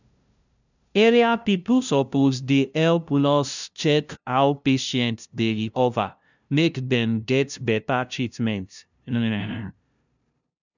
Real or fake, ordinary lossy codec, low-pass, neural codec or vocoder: fake; none; 7.2 kHz; codec, 16 kHz, 0.5 kbps, FunCodec, trained on LibriTTS, 25 frames a second